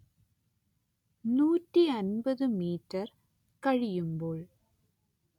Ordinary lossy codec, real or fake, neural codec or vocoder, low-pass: none; real; none; 19.8 kHz